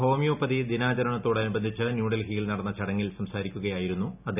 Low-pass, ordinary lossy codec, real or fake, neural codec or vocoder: 3.6 kHz; none; real; none